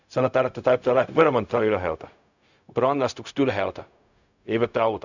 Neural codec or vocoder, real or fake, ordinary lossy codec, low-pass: codec, 16 kHz, 0.4 kbps, LongCat-Audio-Codec; fake; none; 7.2 kHz